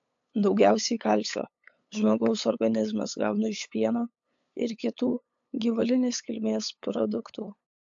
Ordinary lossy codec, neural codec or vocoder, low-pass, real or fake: AAC, 64 kbps; codec, 16 kHz, 8 kbps, FunCodec, trained on LibriTTS, 25 frames a second; 7.2 kHz; fake